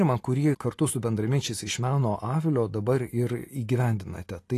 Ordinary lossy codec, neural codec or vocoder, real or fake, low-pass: AAC, 48 kbps; none; real; 14.4 kHz